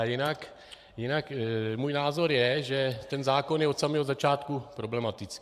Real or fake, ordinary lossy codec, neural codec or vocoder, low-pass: fake; AAC, 96 kbps; vocoder, 44.1 kHz, 128 mel bands every 512 samples, BigVGAN v2; 14.4 kHz